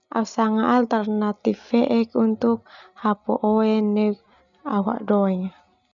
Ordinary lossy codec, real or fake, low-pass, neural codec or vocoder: none; real; 7.2 kHz; none